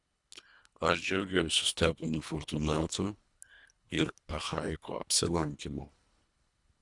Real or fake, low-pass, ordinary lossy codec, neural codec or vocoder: fake; 10.8 kHz; Opus, 64 kbps; codec, 24 kHz, 1.5 kbps, HILCodec